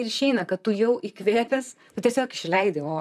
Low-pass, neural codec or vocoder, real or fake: 14.4 kHz; vocoder, 44.1 kHz, 128 mel bands, Pupu-Vocoder; fake